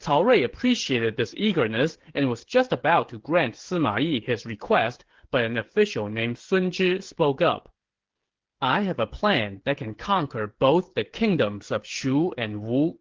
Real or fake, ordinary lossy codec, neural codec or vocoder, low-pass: fake; Opus, 16 kbps; codec, 16 kHz, 8 kbps, FreqCodec, smaller model; 7.2 kHz